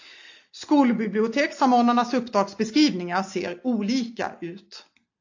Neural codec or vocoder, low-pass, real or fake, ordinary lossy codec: none; 7.2 kHz; real; AAC, 48 kbps